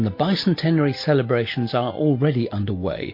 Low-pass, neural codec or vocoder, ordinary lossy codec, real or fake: 5.4 kHz; none; MP3, 32 kbps; real